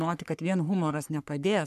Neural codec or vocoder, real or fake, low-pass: codec, 44.1 kHz, 3.4 kbps, Pupu-Codec; fake; 14.4 kHz